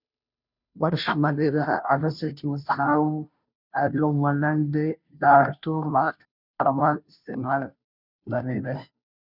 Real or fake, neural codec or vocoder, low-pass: fake; codec, 16 kHz, 0.5 kbps, FunCodec, trained on Chinese and English, 25 frames a second; 5.4 kHz